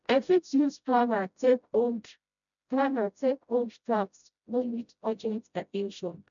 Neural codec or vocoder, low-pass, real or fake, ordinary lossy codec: codec, 16 kHz, 0.5 kbps, FreqCodec, smaller model; 7.2 kHz; fake; none